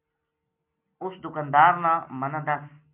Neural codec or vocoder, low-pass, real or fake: none; 3.6 kHz; real